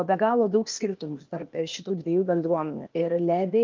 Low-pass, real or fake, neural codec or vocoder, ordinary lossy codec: 7.2 kHz; fake; codec, 16 kHz, 0.8 kbps, ZipCodec; Opus, 32 kbps